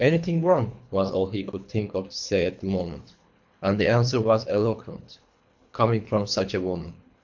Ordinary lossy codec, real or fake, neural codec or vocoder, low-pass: MP3, 64 kbps; fake; codec, 24 kHz, 3 kbps, HILCodec; 7.2 kHz